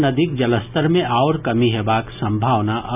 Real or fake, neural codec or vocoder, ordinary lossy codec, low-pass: real; none; none; 3.6 kHz